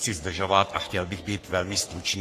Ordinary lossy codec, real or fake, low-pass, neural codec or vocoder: AAC, 48 kbps; fake; 14.4 kHz; codec, 44.1 kHz, 3.4 kbps, Pupu-Codec